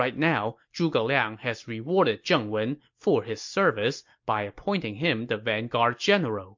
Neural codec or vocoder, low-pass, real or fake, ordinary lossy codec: none; 7.2 kHz; real; MP3, 48 kbps